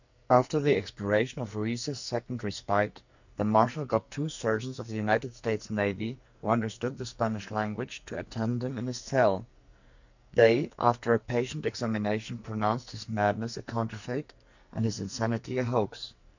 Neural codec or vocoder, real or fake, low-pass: codec, 44.1 kHz, 2.6 kbps, SNAC; fake; 7.2 kHz